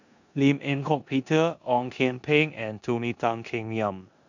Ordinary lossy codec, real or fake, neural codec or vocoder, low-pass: none; fake; codec, 16 kHz in and 24 kHz out, 0.9 kbps, LongCat-Audio-Codec, four codebook decoder; 7.2 kHz